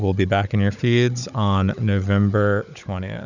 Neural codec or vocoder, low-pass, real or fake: codec, 16 kHz, 16 kbps, FunCodec, trained on Chinese and English, 50 frames a second; 7.2 kHz; fake